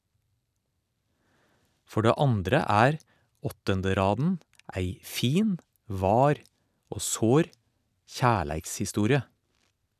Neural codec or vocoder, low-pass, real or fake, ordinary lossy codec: none; 14.4 kHz; real; none